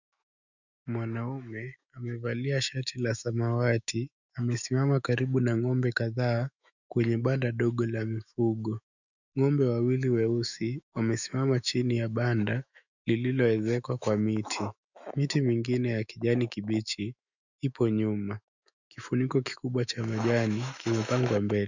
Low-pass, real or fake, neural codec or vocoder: 7.2 kHz; fake; autoencoder, 48 kHz, 128 numbers a frame, DAC-VAE, trained on Japanese speech